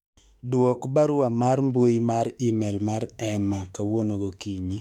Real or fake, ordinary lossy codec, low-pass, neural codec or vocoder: fake; none; 19.8 kHz; autoencoder, 48 kHz, 32 numbers a frame, DAC-VAE, trained on Japanese speech